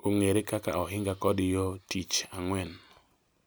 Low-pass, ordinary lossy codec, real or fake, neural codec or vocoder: none; none; real; none